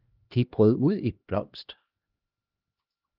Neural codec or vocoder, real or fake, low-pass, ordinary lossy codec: codec, 16 kHz, 1 kbps, X-Codec, HuBERT features, trained on LibriSpeech; fake; 5.4 kHz; Opus, 32 kbps